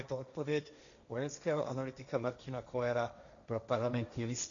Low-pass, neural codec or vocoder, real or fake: 7.2 kHz; codec, 16 kHz, 1.1 kbps, Voila-Tokenizer; fake